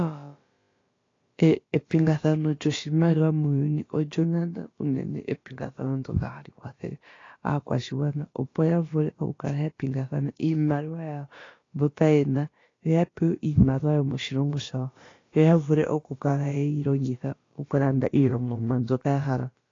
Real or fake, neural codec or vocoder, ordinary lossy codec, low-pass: fake; codec, 16 kHz, about 1 kbps, DyCAST, with the encoder's durations; AAC, 32 kbps; 7.2 kHz